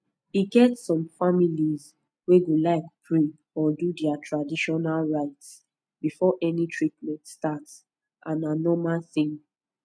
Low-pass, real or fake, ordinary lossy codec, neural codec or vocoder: none; real; none; none